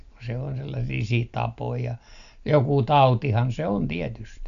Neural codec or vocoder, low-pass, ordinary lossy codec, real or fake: none; 7.2 kHz; none; real